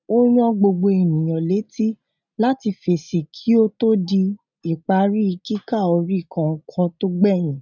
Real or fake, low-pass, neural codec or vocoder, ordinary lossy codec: real; 7.2 kHz; none; none